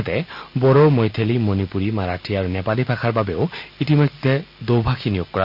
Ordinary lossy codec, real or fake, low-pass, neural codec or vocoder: none; real; 5.4 kHz; none